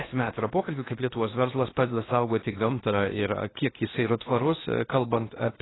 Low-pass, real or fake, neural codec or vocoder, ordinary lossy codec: 7.2 kHz; fake; codec, 16 kHz in and 24 kHz out, 0.8 kbps, FocalCodec, streaming, 65536 codes; AAC, 16 kbps